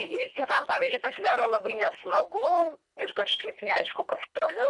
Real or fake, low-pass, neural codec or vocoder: fake; 10.8 kHz; codec, 24 kHz, 1.5 kbps, HILCodec